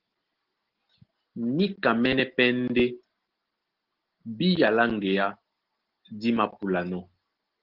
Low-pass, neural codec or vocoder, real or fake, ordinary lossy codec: 5.4 kHz; none; real; Opus, 16 kbps